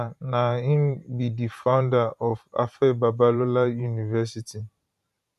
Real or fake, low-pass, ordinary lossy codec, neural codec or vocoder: fake; 14.4 kHz; none; vocoder, 44.1 kHz, 128 mel bands every 512 samples, BigVGAN v2